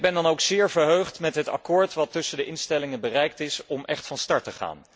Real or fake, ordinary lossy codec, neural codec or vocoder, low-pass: real; none; none; none